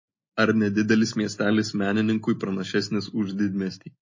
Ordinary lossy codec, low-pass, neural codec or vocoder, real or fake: MP3, 32 kbps; 7.2 kHz; none; real